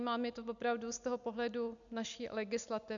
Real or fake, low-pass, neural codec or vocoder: real; 7.2 kHz; none